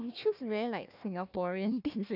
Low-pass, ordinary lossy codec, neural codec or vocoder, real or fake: 5.4 kHz; none; codec, 16 kHz, 1 kbps, FunCodec, trained on Chinese and English, 50 frames a second; fake